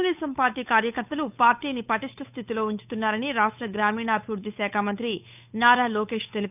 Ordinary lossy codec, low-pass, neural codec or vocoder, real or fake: none; 3.6 kHz; codec, 16 kHz, 8 kbps, FunCodec, trained on Chinese and English, 25 frames a second; fake